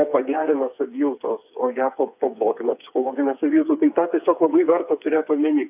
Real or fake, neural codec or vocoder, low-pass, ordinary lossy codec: fake; codec, 16 kHz, 4 kbps, FreqCodec, smaller model; 3.6 kHz; AAC, 32 kbps